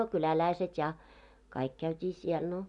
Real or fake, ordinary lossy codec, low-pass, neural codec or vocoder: real; none; 10.8 kHz; none